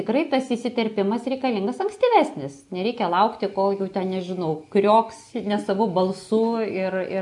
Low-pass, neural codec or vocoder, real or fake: 10.8 kHz; none; real